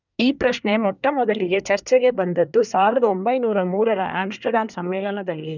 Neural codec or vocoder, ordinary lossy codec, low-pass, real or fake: codec, 24 kHz, 1 kbps, SNAC; none; 7.2 kHz; fake